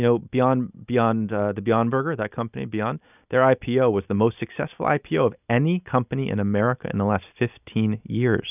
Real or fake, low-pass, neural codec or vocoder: real; 3.6 kHz; none